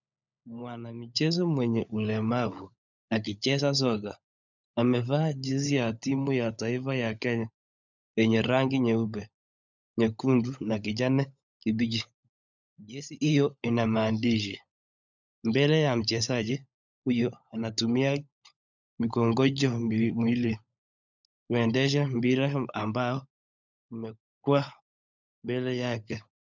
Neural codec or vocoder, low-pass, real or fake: codec, 16 kHz, 16 kbps, FunCodec, trained on LibriTTS, 50 frames a second; 7.2 kHz; fake